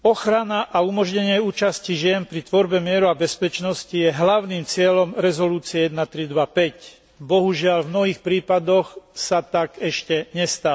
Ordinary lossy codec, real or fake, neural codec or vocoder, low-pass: none; real; none; none